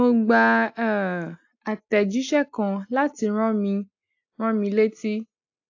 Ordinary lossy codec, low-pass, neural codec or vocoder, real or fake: AAC, 48 kbps; 7.2 kHz; none; real